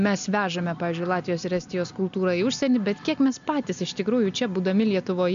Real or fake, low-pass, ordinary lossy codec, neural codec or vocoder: real; 7.2 kHz; MP3, 64 kbps; none